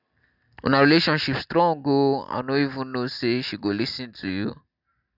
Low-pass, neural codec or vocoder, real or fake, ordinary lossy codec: 5.4 kHz; none; real; none